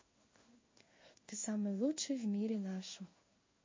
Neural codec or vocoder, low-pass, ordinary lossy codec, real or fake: codec, 16 kHz in and 24 kHz out, 1 kbps, XY-Tokenizer; 7.2 kHz; MP3, 32 kbps; fake